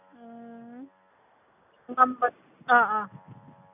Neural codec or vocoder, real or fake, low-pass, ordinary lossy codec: none; real; 3.6 kHz; none